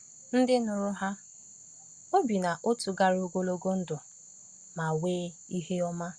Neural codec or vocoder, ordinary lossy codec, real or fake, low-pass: none; Opus, 64 kbps; real; 9.9 kHz